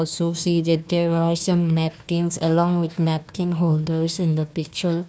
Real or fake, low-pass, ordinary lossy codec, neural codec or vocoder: fake; none; none; codec, 16 kHz, 1 kbps, FunCodec, trained on Chinese and English, 50 frames a second